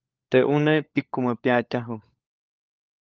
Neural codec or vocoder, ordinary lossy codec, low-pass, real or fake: codec, 16 kHz, 4 kbps, FunCodec, trained on LibriTTS, 50 frames a second; Opus, 32 kbps; 7.2 kHz; fake